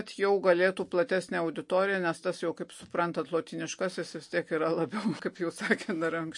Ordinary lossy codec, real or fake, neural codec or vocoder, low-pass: MP3, 48 kbps; real; none; 10.8 kHz